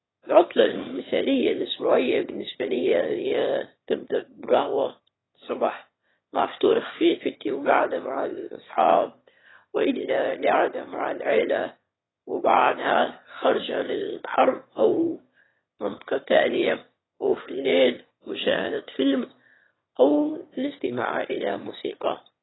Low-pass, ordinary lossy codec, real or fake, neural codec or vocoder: 7.2 kHz; AAC, 16 kbps; fake; autoencoder, 22.05 kHz, a latent of 192 numbers a frame, VITS, trained on one speaker